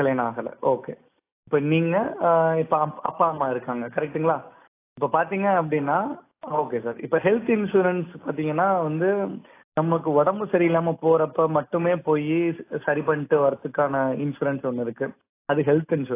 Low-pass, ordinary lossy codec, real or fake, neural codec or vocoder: 3.6 kHz; AAC, 24 kbps; real; none